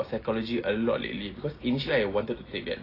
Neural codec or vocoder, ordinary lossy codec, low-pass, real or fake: none; AAC, 24 kbps; 5.4 kHz; real